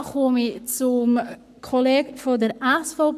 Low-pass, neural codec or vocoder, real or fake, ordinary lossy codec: 14.4 kHz; codec, 44.1 kHz, 7.8 kbps, Pupu-Codec; fake; none